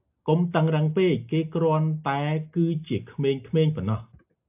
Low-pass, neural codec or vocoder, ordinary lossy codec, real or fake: 3.6 kHz; none; AAC, 32 kbps; real